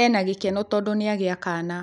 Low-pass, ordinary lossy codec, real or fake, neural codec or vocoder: 10.8 kHz; none; real; none